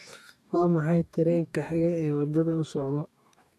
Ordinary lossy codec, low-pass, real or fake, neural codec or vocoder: none; 14.4 kHz; fake; codec, 44.1 kHz, 2.6 kbps, DAC